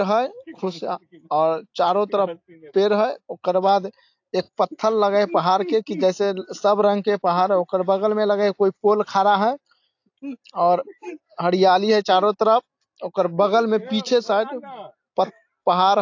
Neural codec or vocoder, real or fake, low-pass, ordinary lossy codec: none; real; 7.2 kHz; AAC, 48 kbps